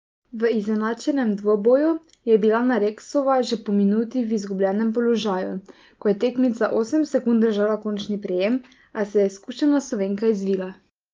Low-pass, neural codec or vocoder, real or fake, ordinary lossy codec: 7.2 kHz; none; real; Opus, 32 kbps